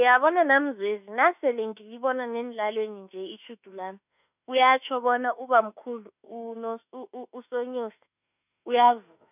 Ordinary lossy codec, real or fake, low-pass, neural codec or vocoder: none; fake; 3.6 kHz; autoencoder, 48 kHz, 32 numbers a frame, DAC-VAE, trained on Japanese speech